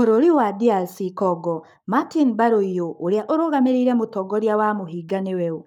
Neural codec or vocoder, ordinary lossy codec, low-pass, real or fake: codec, 44.1 kHz, 7.8 kbps, Pupu-Codec; none; 19.8 kHz; fake